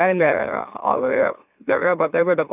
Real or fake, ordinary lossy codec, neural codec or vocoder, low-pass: fake; none; autoencoder, 44.1 kHz, a latent of 192 numbers a frame, MeloTTS; 3.6 kHz